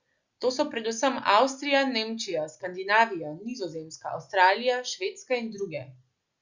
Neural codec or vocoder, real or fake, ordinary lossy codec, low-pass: none; real; Opus, 64 kbps; 7.2 kHz